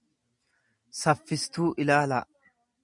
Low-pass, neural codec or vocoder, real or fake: 10.8 kHz; none; real